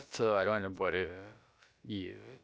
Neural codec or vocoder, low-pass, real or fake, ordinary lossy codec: codec, 16 kHz, about 1 kbps, DyCAST, with the encoder's durations; none; fake; none